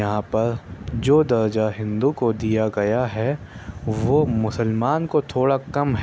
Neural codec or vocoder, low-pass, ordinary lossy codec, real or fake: none; none; none; real